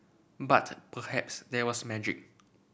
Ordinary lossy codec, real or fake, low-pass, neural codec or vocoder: none; real; none; none